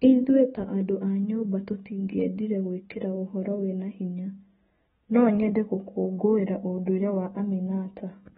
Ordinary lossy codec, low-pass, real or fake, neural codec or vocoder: AAC, 16 kbps; 19.8 kHz; real; none